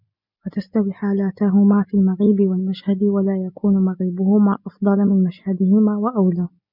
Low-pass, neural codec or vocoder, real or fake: 5.4 kHz; none; real